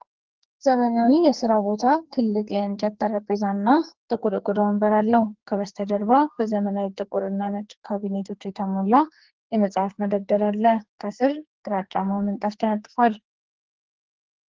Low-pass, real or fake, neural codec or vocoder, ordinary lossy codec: 7.2 kHz; fake; codec, 44.1 kHz, 2.6 kbps, SNAC; Opus, 16 kbps